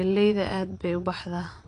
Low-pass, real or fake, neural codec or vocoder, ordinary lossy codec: 9.9 kHz; fake; vocoder, 22.05 kHz, 80 mel bands, Vocos; AAC, 48 kbps